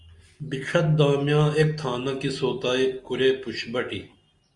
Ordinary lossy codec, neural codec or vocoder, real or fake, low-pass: Opus, 64 kbps; none; real; 10.8 kHz